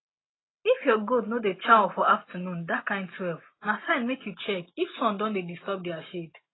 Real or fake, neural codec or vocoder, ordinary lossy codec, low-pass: real; none; AAC, 16 kbps; 7.2 kHz